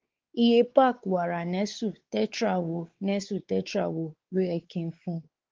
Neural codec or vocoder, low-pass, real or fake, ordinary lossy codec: codec, 16 kHz, 4 kbps, X-Codec, WavLM features, trained on Multilingual LibriSpeech; 7.2 kHz; fake; Opus, 16 kbps